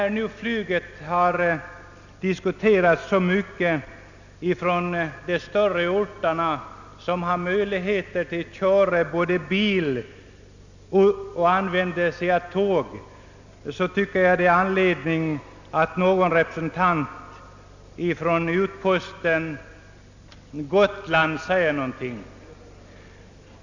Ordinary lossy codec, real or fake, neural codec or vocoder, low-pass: none; real; none; 7.2 kHz